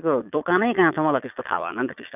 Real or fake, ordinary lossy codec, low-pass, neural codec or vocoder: fake; none; 3.6 kHz; vocoder, 22.05 kHz, 80 mel bands, Vocos